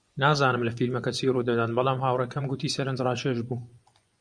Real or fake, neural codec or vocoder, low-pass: fake; vocoder, 24 kHz, 100 mel bands, Vocos; 9.9 kHz